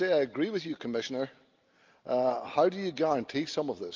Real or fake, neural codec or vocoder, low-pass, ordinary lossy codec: real; none; 7.2 kHz; Opus, 24 kbps